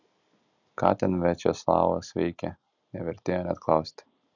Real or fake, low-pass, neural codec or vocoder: real; 7.2 kHz; none